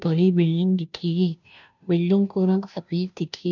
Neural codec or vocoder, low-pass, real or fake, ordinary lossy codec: codec, 16 kHz, 0.5 kbps, FunCodec, trained on Chinese and English, 25 frames a second; 7.2 kHz; fake; none